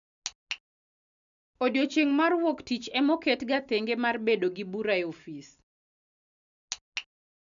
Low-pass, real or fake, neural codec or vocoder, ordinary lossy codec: 7.2 kHz; real; none; none